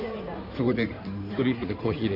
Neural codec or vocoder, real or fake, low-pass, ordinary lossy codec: codec, 16 kHz in and 24 kHz out, 2.2 kbps, FireRedTTS-2 codec; fake; 5.4 kHz; none